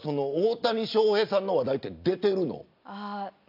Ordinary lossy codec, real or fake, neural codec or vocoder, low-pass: AAC, 48 kbps; real; none; 5.4 kHz